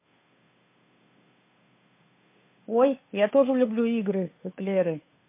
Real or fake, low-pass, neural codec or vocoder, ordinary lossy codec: fake; 3.6 kHz; codec, 16 kHz, 2 kbps, FunCodec, trained on Chinese and English, 25 frames a second; MP3, 24 kbps